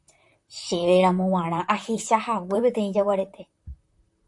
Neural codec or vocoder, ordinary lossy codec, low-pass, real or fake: vocoder, 44.1 kHz, 128 mel bands, Pupu-Vocoder; AAC, 64 kbps; 10.8 kHz; fake